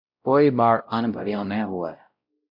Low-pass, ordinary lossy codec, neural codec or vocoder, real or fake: 5.4 kHz; AAC, 48 kbps; codec, 16 kHz, 0.5 kbps, X-Codec, WavLM features, trained on Multilingual LibriSpeech; fake